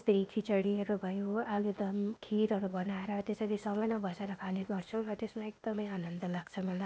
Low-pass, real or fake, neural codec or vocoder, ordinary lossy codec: none; fake; codec, 16 kHz, 0.8 kbps, ZipCodec; none